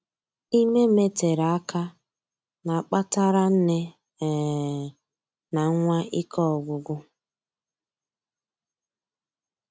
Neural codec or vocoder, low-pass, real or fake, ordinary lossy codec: none; none; real; none